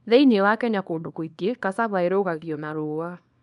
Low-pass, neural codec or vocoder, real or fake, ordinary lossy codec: 10.8 kHz; codec, 24 kHz, 0.9 kbps, WavTokenizer, small release; fake; none